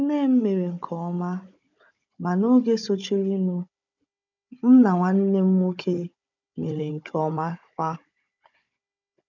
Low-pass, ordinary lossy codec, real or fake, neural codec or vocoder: 7.2 kHz; none; fake; codec, 16 kHz, 16 kbps, FunCodec, trained on Chinese and English, 50 frames a second